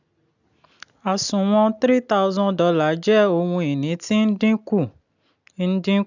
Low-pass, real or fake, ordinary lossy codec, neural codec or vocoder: 7.2 kHz; real; none; none